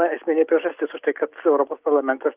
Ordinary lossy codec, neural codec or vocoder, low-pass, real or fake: Opus, 32 kbps; none; 3.6 kHz; real